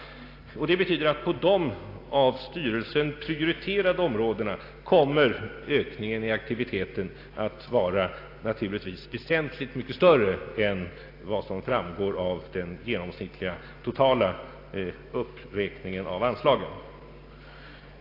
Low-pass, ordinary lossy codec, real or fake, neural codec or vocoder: 5.4 kHz; AAC, 32 kbps; real; none